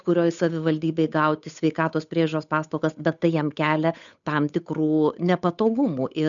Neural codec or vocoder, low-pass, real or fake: codec, 16 kHz, 8 kbps, FunCodec, trained on Chinese and English, 25 frames a second; 7.2 kHz; fake